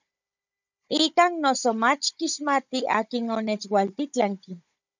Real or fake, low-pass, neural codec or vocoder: fake; 7.2 kHz; codec, 16 kHz, 16 kbps, FunCodec, trained on Chinese and English, 50 frames a second